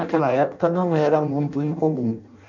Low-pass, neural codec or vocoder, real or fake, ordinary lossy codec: 7.2 kHz; codec, 16 kHz in and 24 kHz out, 0.6 kbps, FireRedTTS-2 codec; fake; none